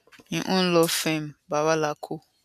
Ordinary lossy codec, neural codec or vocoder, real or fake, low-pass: none; none; real; 14.4 kHz